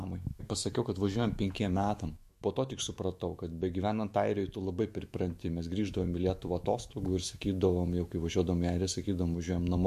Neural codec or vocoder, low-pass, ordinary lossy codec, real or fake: autoencoder, 48 kHz, 128 numbers a frame, DAC-VAE, trained on Japanese speech; 14.4 kHz; MP3, 64 kbps; fake